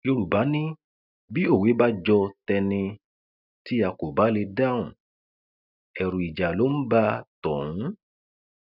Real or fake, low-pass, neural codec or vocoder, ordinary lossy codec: real; 5.4 kHz; none; none